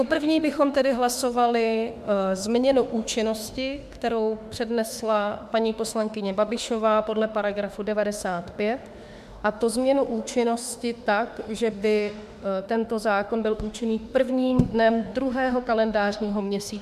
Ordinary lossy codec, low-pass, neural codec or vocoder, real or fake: MP3, 96 kbps; 14.4 kHz; autoencoder, 48 kHz, 32 numbers a frame, DAC-VAE, trained on Japanese speech; fake